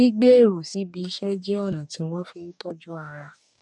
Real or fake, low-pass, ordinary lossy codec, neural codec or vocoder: fake; 10.8 kHz; none; codec, 44.1 kHz, 2.6 kbps, DAC